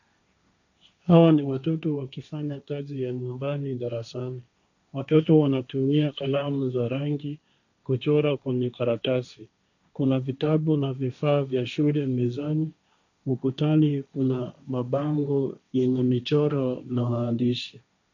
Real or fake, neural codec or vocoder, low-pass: fake; codec, 16 kHz, 1.1 kbps, Voila-Tokenizer; 7.2 kHz